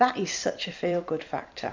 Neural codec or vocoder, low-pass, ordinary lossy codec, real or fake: vocoder, 44.1 kHz, 128 mel bands every 256 samples, BigVGAN v2; 7.2 kHz; MP3, 64 kbps; fake